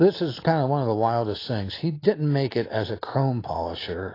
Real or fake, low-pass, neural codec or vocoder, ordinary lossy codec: real; 5.4 kHz; none; AAC, 24 kbps